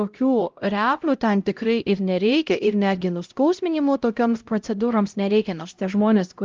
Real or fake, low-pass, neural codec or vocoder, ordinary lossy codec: fake; 7.2 kHz; codec, 16 kHz, 0.5 kbps, X-Codec, HuBERT features, trained on LibriSpeech; Opus, 32 kbps